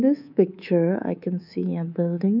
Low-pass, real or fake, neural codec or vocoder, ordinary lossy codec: 5.4 kHz; fake; codec, 24 kHz, 3.1 kbps, DualCodec; none